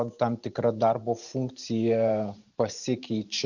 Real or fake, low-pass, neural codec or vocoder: fake; 7.2 kHz; vocoder, 44.1 kHz, 128 mel bands every 512 samples, BigVGAN v2